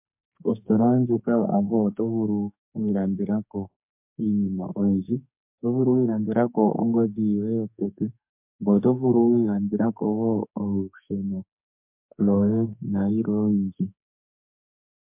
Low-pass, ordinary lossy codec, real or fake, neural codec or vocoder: 3.6 kHz; MP3, 32 kbps; fake; codec, 44.1 kHz, 2.6 kbps, SNAC